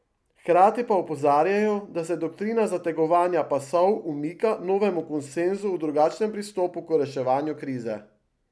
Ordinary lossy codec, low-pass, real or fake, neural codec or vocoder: none; none; real; none